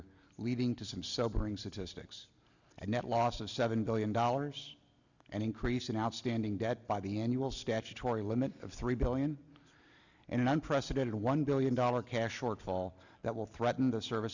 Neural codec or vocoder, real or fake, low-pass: none; real; 7.2 kHz